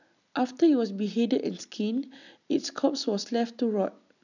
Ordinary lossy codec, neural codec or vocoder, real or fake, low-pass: none; none; real; 7.2 kHz